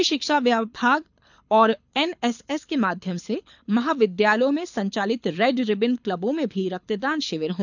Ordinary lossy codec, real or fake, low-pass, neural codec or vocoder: none; fake; 7.2 kHz; codec, 24 kHz, 6 kbps, HILCodec